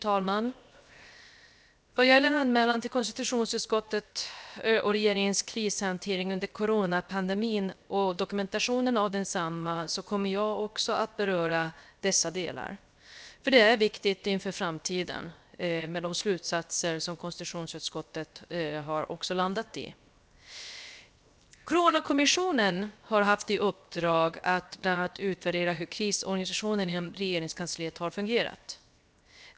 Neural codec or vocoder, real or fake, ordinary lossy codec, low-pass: codec, 16 kHz, 0.7 kbps, FocalCodec; fake; none; none